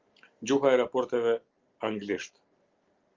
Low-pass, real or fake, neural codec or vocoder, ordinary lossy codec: 7.2 kHz; real; none; Opus, 32 kbps